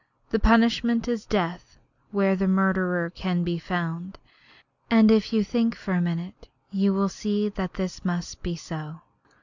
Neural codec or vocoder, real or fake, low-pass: none; real; 7.2 kHz